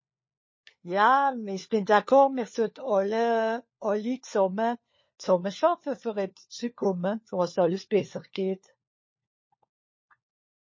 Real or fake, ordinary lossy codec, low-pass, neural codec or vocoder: fake; MP3, 32 kbps; 7.2 kHz; codec, 16 kHz, 4 kbps, FunCodec, trained on LibriTTS, 50 frames a second